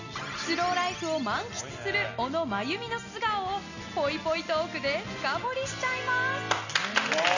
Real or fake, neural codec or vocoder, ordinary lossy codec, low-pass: real; none; none; 7.2 kHz